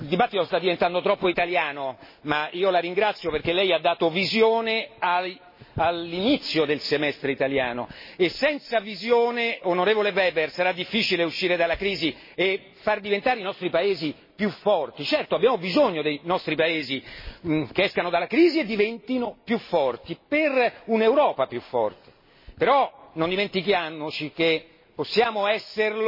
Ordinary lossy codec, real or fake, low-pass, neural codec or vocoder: MP3, 24 kbps; real; 5.4 kHz; none